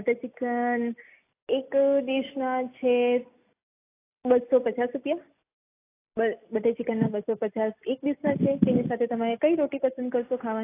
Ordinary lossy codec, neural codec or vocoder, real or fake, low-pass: AAC, 24 kbps; none; real; 3.6 kHz